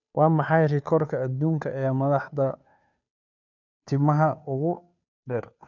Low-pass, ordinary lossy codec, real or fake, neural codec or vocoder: 7.2 kHz; none; fake; codec, 16 kHz, 2 kbps, FunCodec, trained on Chinese and English, 25 frames a second